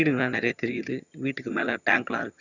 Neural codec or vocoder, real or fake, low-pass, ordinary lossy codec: vocoder, 22.05 kHz, 80 mel bands, HiFi-GAN; fake; 7.2 kHz; none